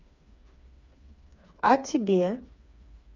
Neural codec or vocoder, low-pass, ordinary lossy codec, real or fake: codec, 16 kHz, 4 kbps, FreqCodec, smaller model; 7.2 kHz; MP3, 64 kbps; fake